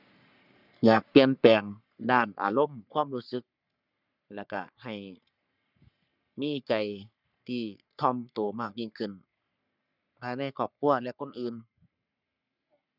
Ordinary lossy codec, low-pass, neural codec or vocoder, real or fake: none; 5.4 kHz; codec, 44.1 kHz, 3.4 kbps, Pupu-Codec; fake